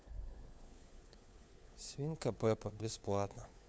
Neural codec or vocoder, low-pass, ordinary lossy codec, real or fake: codec, 16 kHz, 4 kbps, FunCodec, trained on LibriTTS, 50 frames a second; none; none; fake